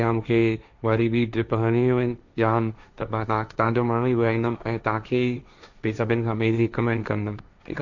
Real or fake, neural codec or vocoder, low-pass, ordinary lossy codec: fake; codec, 16 kHz, 1.1 kbps, Voila-Tokenizer; 7.2 kHz; none